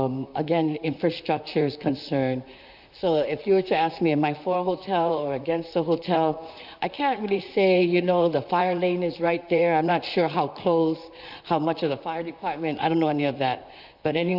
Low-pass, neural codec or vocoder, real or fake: 5.4 kHz; vocoder, 44.1 kHz, 128 mel bands, Pupu-Vocoder; fake